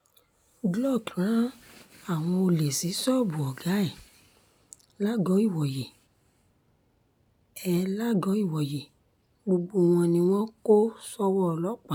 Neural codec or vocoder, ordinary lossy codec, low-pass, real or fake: none; none; none; real